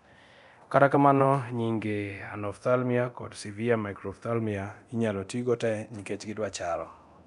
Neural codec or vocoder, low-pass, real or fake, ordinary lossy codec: codec, 24 kHz, 0.9 kbps, DualCodec; 10.8 kHz; fake; none